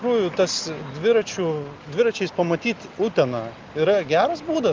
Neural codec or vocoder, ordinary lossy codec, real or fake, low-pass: none; Opus, 32 kbps; real; 7.2 kHz